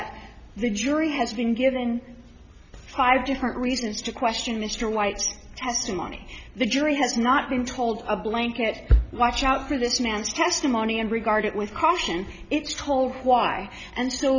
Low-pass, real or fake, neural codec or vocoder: 7.2 kHz; real; none